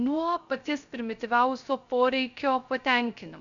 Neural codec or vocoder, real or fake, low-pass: codec, 16 kHz, 0.3 kbps, FocalCodec; fake; 7.2 kHz